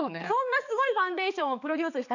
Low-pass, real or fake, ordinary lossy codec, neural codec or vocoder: 7.2 kHz; fake; none; codec, 16 kHz, 4 kbps, X-Codec, HuBERT features, trained on balanced general audio